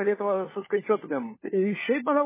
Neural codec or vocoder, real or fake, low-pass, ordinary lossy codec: codec, 16 kHz, 4 kbps, FunCodec, trained on LibriTTS, 50 frames a second; fake; 3.6 kHz; MP3, 16 kbps